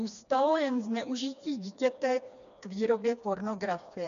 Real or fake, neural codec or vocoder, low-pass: fake; codec, 16 kHz, 2 kbps, FreqCodec, smaller model; 7.2 kHz